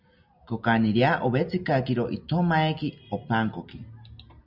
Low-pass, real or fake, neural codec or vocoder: 5.4 kHz; real; none